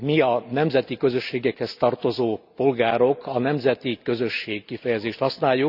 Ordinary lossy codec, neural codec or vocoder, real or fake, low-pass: none; none; real; 5.4 kHz